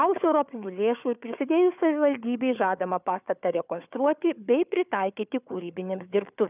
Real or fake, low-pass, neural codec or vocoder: fake; 3.6 kHz; codec, 16 kHz, 4 kbps, FunCodec, trained on Chinese and English, 50 frames a second